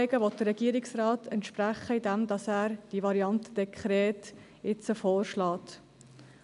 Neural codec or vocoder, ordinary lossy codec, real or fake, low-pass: none; none; real; 10.8 kHz